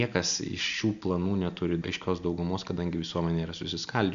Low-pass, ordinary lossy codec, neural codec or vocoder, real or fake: 7.2 kHz; AAC, 96 kbps; none; real